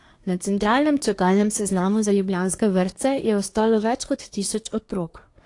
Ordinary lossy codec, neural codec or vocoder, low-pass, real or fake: AAC, 48 kbps; codec, 24 kHz, 1 kbps, SNAC; 10.8 kHz; fake